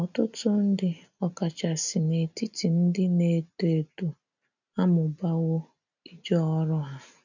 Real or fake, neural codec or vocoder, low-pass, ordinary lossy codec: real; none; 7.2 kHz; none